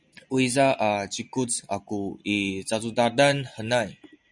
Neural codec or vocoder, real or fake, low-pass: none; real; 10.8 kHz